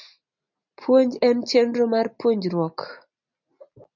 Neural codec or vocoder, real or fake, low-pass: none; real; 7.2 kHz